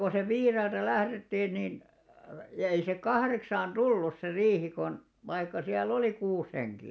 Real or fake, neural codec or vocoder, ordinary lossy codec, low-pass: real; none; none; none